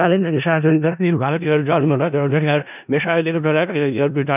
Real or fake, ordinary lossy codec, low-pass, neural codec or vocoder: fake; none; 3.6 kHz; codec, 16 kHz in and 24 kHz out, 0.4 kbps, LongCat-Audio-Codec, four codebook decoder